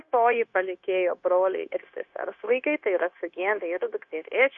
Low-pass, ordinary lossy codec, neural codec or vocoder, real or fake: 7.2 kHz; Opus, 64 kbps; codec, 16 kHz, 0.9 kbps, LongCat-Audio-Codec; fake